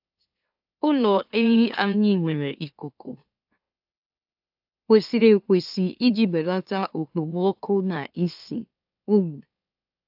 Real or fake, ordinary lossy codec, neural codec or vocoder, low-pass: fake; AAC, 48 kbps; autoencoder, 44.1 kHz, a latent of 192 numbers a frame, MeloTTS; 5.4 kHz